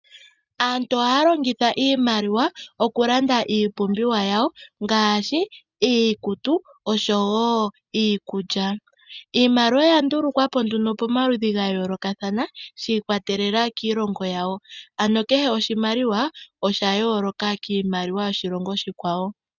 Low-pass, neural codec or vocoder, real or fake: 7.2 kHz; none; real